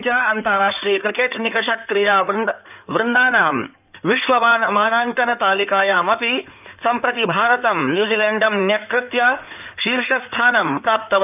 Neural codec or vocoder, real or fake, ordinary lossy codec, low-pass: codec, 16 kHz in and 24 kHz out, 2.2 kbps, FireRedTTS-2 codec; fake; none; 3.6 kHz